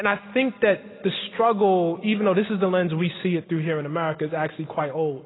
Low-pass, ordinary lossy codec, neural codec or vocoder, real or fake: 7.2 kHz; AAC, 16 kbps; none; real